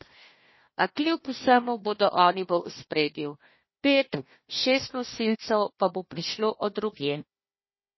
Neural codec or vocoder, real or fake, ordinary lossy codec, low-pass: codec, 16 kHz, 1 kbps, FunCodec, trained on Chinese and English, 50 frames a second; fake; MP3, 24 kbps; 7.2 kHz